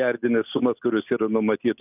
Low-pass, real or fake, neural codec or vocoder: 3.6 kHz; real; none